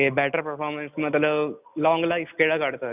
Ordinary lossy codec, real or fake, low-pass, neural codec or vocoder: none; real; 3.6 kHz; none